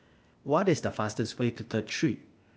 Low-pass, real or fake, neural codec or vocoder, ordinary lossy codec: none; fake; codec, 16 kHz, 0.8 kbps, ZipCodec; none